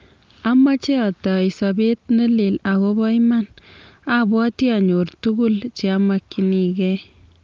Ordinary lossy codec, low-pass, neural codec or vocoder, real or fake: Opus, 32 kbps; 7.2 kHz; none; real